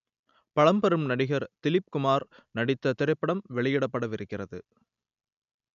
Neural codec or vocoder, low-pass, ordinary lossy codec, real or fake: none; 7.2 kHz; MP3, 96 kbps; real